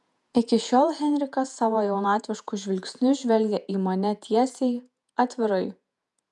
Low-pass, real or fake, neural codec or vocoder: 10.8 kHz; fake; vocoder, 48 kHz, 128 mel bands, Vocos